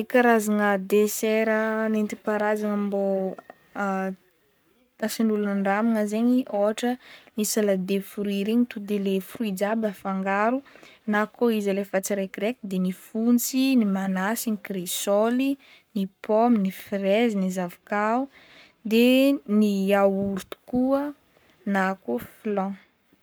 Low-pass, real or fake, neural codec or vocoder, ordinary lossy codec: none; fake; codec, 44.1 kHz, 7.8 kbps, Pupu-Codec; none